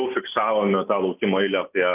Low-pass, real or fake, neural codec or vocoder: 3.6 kHz; real; none